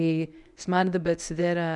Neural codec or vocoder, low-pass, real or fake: codec, 24 kHz, 0.9 kbps, WavTokenizer, medium speech release version 1; 10.8 kHz; fake